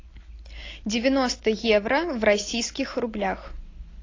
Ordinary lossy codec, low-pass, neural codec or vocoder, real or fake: AAC, 32 kbps; 7.2 kHz; none; real